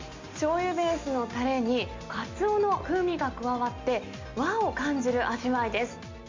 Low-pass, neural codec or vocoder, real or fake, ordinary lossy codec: 7.2 kHz; none; real; MP3, 48 kbps